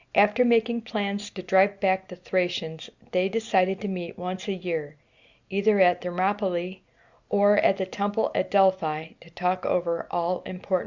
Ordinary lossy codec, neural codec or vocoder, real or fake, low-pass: Opus, 64 kbps; none; real; 7.2 kHz